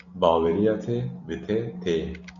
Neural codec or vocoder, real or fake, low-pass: none; real; 7.2 kHz